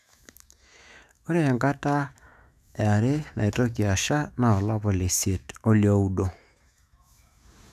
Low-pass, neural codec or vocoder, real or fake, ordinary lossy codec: 14.4 kHz; autoencoder, 48 kHz, 128 numbers a frame, DAC-VAE, trained on Japanese speech; fake; none